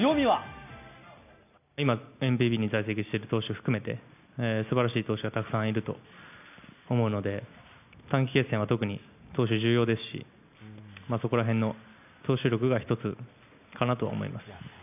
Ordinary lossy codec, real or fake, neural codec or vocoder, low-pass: none; real; none; 3.6 kHz